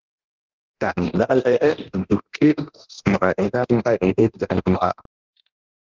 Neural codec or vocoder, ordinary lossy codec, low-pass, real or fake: codec, 24 kHz, 0.9 kbps, DualCodec; Opus, 24 kbps; 7.2 kHz; fake